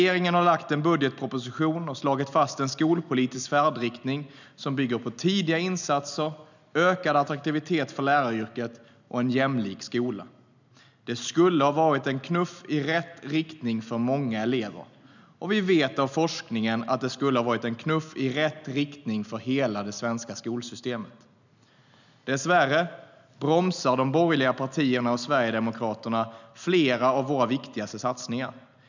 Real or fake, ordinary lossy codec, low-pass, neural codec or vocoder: real; none; 7.2 kHz; none